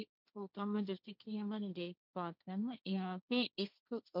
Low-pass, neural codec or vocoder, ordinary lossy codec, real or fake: 5.4 kHz; codec, 16 kHz, 1.1 kbps, Voila-Tokenizer; none; fake